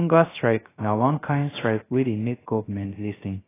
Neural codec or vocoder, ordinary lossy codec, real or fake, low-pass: codec, 16 kHz, 0.3 kbps, FocalCodec; AAC, 16 kbps; fake; 3.6 kHz